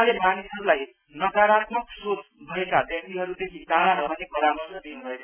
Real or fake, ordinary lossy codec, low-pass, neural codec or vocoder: real; none; 3.6 kHz; none